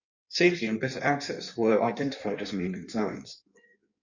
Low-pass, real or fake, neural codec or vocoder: 7.2 kHz; fake; codec, 16 kHz in and 24 kHz out, 1.1 kbps, FireRedTTS-2 codec